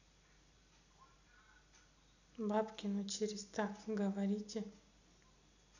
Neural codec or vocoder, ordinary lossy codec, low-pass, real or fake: none; none; 7.2 kHz; real